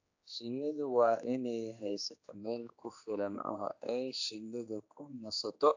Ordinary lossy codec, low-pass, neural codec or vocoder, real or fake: AAC, 48 kbps; 7.2 kHz; codec, 16 kHz, 2 kbps, X-Codec, HuBERT features, trained on general audio; fake